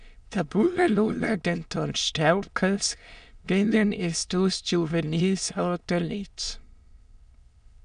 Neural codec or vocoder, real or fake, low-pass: autoencoder, 22.05 kHz, a latent of 192 numbers a frame, VITS, trained on many speakers; fake; 9.9 kHz